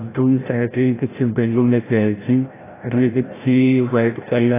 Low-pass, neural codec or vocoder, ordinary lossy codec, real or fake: 3.6 kHz; codec, 16 kHz, 0.5 kbps, FreqCodec, larger model; AAC, 16 kbps; fake